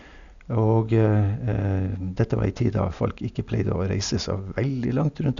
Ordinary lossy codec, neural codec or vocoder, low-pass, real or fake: none; none; 7.2 kHz; real